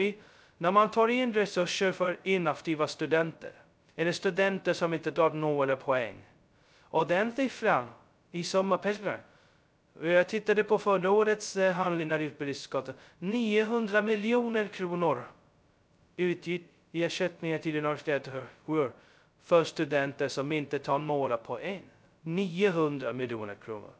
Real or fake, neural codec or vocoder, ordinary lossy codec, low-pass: fake; codec, 16 kHz, 0.2 kbps, FocalCodec; none; none